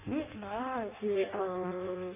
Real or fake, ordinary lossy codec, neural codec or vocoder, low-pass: fake; MP3, 32 kbps; codec, 16 kHz in and 24 kHz out, 0.6 kbps, FireRedTTS-2 codec; 3.6 kHz